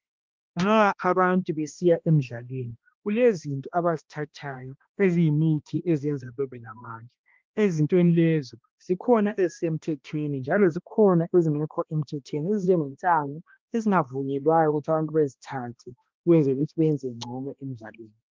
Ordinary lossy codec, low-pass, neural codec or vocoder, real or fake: Opus, 32 kbps; 7.2 kHz; codec, 16 kHz, 1 kbps, X-Codec, HuBERT features, trained on balanced general audio; fake